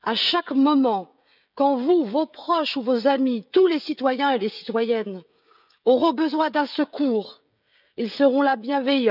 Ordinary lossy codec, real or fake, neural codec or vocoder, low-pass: none; fake; codec, 16 kHz, 16 kbps, FreqCodec, smaller model; 5.4 kHz